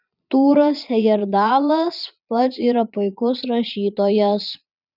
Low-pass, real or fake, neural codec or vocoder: 5.4 kHz; fake; vocoder, 22.05 kHz, 80 mel bands, Vocos